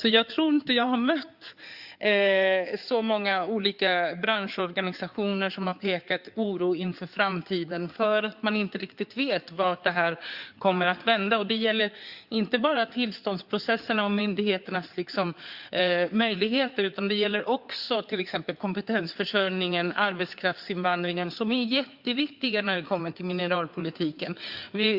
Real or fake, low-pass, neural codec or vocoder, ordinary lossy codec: fake; 5.4 kHz; codec, 16 kHz in and 24 kHz out, 2.2 kbps, FireRedTTS-2 codec; Opus, 64 kbps